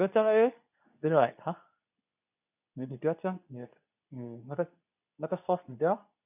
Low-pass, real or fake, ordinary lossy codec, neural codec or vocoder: 3.6 kHz; fake; none; codec, 24 kHz, 0.9 kbps, WavTokenizer, medium speech release version 2